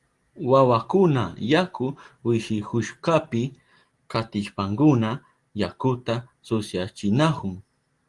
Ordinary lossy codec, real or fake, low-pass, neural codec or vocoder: Opus, 24 kbps; real; 10.8 kHz; none